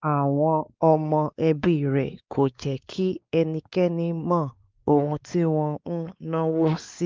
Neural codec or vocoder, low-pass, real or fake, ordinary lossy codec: codec, 16 kHz, 4 kbps, X-Codec, WavLM features, trained on Multilingual LibriSpeech; 7.2 kHz; fake; Opus, 32 kbps